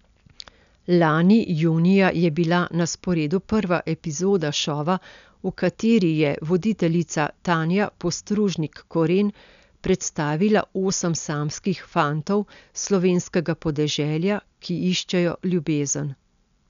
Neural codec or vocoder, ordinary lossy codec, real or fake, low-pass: none; none; real; 7.2 kHz